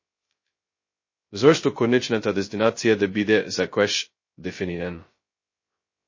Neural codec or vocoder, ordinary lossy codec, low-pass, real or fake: codec, 16 kHz, 0.2 kbps, FocalCodec; MP3, 32 kbps; 7.2 kHz; fake